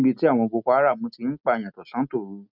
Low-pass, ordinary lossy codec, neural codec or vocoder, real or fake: 5.4 kHz; none; none; real